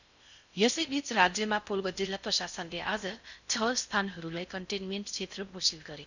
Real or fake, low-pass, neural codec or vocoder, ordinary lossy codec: fake; 7.2 kHz; codec, 16 kHz in and 24 kHz out, 0.6 kbps, FocalCodec, streaming, 4096 codes; none